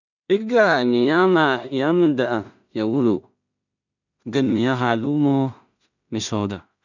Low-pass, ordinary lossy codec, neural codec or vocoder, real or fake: 7.2 kHz; none; codec, 16 kHz in and 24 kHz out, 0.4 kbps, LongCat-Audio-Codec, two codebook decoder; fake